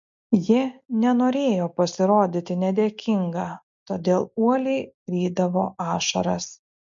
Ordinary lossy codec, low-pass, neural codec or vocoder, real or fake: MP3, 48 kbps; 7.2 kHz; none; real